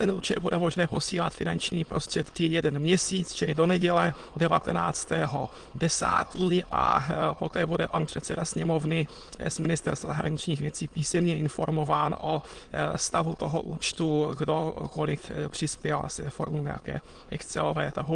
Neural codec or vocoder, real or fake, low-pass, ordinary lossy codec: autoencoder, 22.05 kHz, a latent of 192 numbers a frame, VITS, trained on many speakers; fake; 9.9 kHz; Opus, 24 kbps